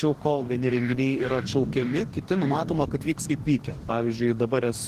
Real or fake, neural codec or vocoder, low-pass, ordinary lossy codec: fake; codec, 44.1 kHz, 2.6 kbps, DAC; 14.4 kHz; Opus, 16 kbps